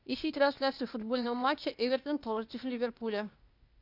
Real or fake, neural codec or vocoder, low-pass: fake; codec, 16 kHz, 0.8 kbps, ZipCodec; 5.4 kHz